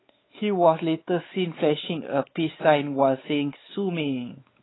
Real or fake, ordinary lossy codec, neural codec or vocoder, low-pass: fake; AAC, 16 kbps; codec, 16 kHz, 4 kbps, X-Codec, WavLM features, trained on Multilingual LibriSpeech; 7.2 kHz